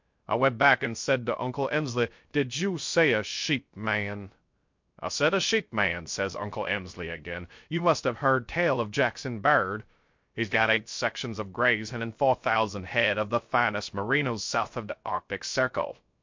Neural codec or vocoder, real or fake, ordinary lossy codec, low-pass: codec, 16 kHz, 0.3 kbps, FocalCodec; fake; MP3, 48 kbps; 7.2 kHz